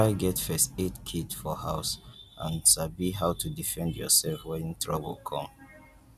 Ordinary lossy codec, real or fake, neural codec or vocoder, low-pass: none; real; none; 14.4 kHz